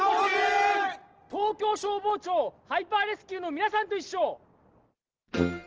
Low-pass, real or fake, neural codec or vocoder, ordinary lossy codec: 7.2 kHz; real; none; Opus, 16 kbps